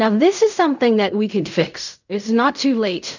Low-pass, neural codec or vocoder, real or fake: 7.2 kHz; codec, 16 kHz in and 24 kHz out, 0.4 kbps, LongCat-Audio-Codec, fine tuned four codebook decoder; fake